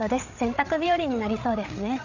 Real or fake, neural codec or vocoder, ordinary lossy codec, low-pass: fake; codec, 16 kHz, 8 kbps, FreqCodec, larger model; none; 7.2 kHz